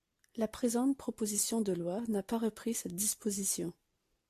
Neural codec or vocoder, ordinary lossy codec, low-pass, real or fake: none; AAC, 64 kbps; 14.4 kHz; real